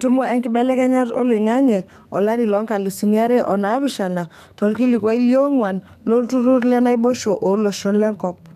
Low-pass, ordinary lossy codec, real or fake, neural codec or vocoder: 14.4 kHz; none; fake; codec, 32 kHz, 1.9 kbps, SNAC